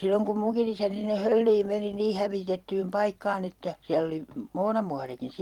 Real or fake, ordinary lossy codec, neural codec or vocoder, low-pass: fake; Opus, 24 kbps; vocoder, 44.1 kHz, 128 mel bands every 256 samples, BigVGAN v2; 19.8 kHz